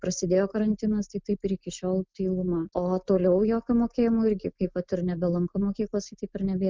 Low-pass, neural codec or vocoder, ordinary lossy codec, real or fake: 7.2 kHz; none; Opus, 24 kbps; real